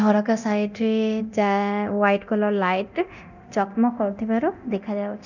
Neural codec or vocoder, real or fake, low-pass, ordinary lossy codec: codec, 24 kHz, 0.9 kbps, DualCodec; fake; 7.2 kHz; none